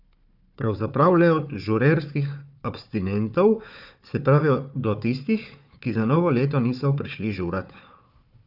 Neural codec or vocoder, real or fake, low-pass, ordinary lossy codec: codec, 16 kHz, 4 kbps, FunCodec, trained on Chinese and English, 50 frames a second; fake; 5.4 kHz; none